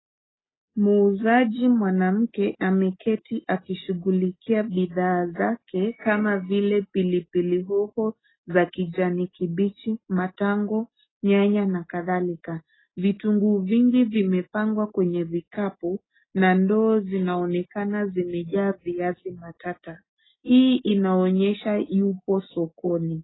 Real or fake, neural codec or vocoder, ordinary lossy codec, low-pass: real; none; AAC, 16 kbps; 7.2 kHz